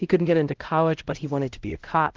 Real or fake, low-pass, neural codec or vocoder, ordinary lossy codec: fake; 7.2 kHz; codec, 16 kHz, 0.5 kbps, X-Codec, HuBERT features, trained on LibriSpeech; Opus, 16 kbps